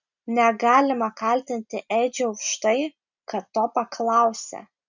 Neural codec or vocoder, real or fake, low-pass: none; real; 7.2 kHz